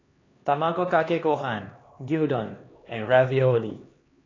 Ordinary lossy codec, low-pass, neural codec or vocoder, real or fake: AAC, 32 kbps; 7.2 kHz; codec, 16 kHz, 2 kbps, X-Codec, HuBERT features, trained on LibriSpeech; fake